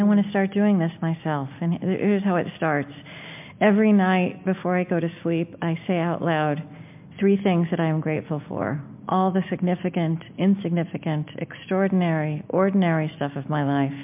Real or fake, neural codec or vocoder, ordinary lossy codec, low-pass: real; none; MP3, 32 kbps; 3.6 kHz